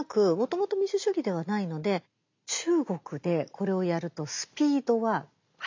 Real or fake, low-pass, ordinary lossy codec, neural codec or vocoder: real; 7.2 kHz; MP3, 64 kbps; none